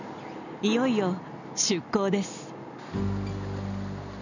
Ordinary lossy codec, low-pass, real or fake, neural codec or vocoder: none; 7.2 kHz; real; none